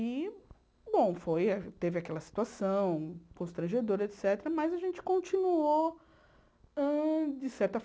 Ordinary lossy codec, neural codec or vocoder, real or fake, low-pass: none; none; real; none